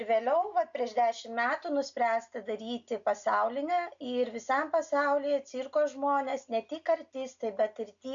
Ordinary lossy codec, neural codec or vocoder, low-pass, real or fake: AAC, 64 kbps; none; 7.2 kHz; real